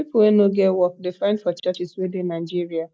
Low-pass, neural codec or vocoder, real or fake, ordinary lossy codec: none; none; real; none